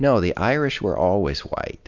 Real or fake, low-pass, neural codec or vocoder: real; 7.2 kHz; none